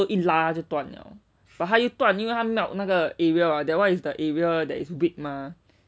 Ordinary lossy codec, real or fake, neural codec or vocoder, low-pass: none; real; none; none